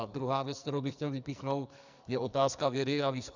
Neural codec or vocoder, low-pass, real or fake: codec, 44.1 kHz, 2.6 kbps, SNAC; 7.2 kHz; fake